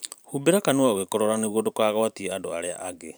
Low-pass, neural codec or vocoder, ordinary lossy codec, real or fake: none; vocoder, 44.1 kHz, 128 mel bands every 512 samples, BigVGAN v2; none; fake